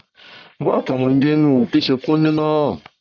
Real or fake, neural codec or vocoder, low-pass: fake; codec, 44.1 kHz, 1.7 kbps, Pupu-Codec; 7.2 kHz